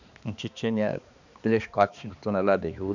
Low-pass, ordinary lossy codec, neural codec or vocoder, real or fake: 7.2 kHz; none; codec, 16 kHz, 2 kbps, X-Codec, HuBERT features, trained on balanced general audio; fake